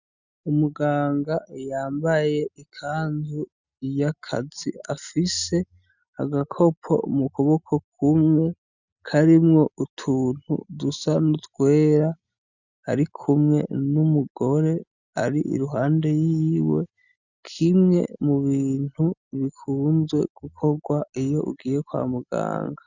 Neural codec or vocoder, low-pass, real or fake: none; 7.2 kHz; real